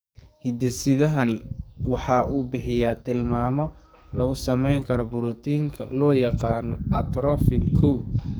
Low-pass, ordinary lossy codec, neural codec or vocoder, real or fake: none; none; codec, 44.1 kHz, 2.6 kbps, SNAC; fake